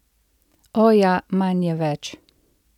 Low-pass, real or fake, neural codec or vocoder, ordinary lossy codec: 19.8 kHz; real; none; none